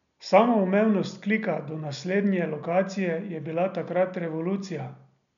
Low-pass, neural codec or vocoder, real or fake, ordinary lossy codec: 7.2 kHz; none; real; none